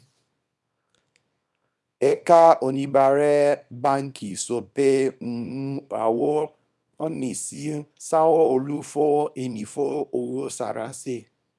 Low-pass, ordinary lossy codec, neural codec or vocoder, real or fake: none; none; codec, 24 kHz, 0.9 kbps, WavTokenizer, small release; fake